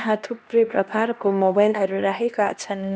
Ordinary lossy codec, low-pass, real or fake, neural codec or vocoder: none; none; fake; codec, 16 kHz, 1 kbps, X-Codec, HuBERT features, trained on LibriSpeech